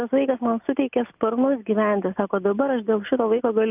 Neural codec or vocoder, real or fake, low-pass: none; real; 3.6 kHz